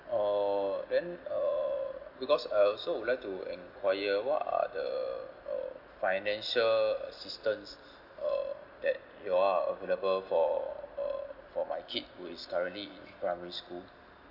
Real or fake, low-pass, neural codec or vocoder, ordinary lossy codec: real; 5.4 kHz; none; none